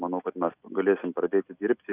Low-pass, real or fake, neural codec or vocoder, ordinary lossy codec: 3.6 kHz; real; none; Opus, 64 kbps